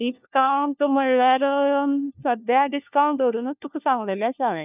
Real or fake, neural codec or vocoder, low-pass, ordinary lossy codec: fake; codec, 16 kHz, 1 kbps, FunCodec, trained on LibriTTS, 50 frames a second; 3.6 kHz; none